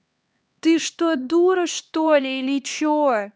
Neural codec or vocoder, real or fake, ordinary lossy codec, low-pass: codec, 16 kHz, 2 kbps, X-Codec, HuBERT features, trained on LibriSpeech; fake; none; none